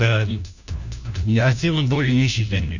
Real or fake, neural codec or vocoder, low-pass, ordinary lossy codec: fake; codec, 16 kHz, 1 kbps, FunCodec, trained on LibriTTS, 50 frames a second; 7.2 kHz; none